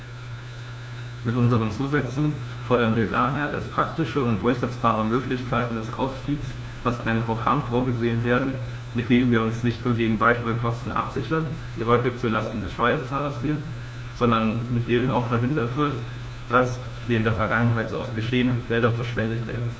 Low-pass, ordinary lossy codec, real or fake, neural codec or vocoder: none; none; fake; codec, 16 kHz, 1 kbps, FunCodec, trained on LibriTTS, 50 frames a second